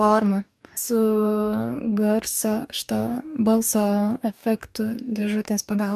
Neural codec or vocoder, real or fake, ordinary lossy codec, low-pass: codec, 44.1 kHz, 2.6 kbps, DAC; fake; MP3, 96 kbps; 14.4 kHz